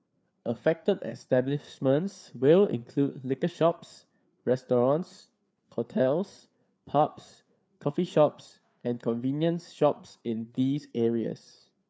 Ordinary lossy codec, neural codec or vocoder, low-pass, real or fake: none; codec, 16 kHz, 4 kbps, FreqCodec, larger model; none; fake